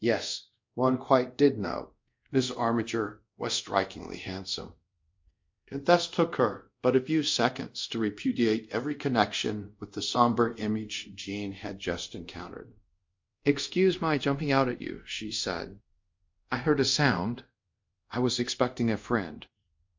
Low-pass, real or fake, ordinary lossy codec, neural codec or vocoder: 7.2 kHz; fake; MP3, 48 kbps; codec, 24 kHz, 0.5 kbps, DualCodec